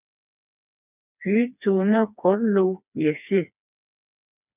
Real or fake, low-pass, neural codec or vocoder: fake; 3.6 kHz; codec, 16 kHz, 2 kbps, FreqCodec, smaller model